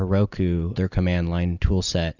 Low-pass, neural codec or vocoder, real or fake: 7.2 kHz; none; real